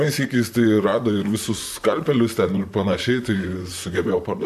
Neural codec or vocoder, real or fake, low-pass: vocoder, 44.1 kHz, 128 mel bands, Pupu-Vocoder; fake; 14.4 kHz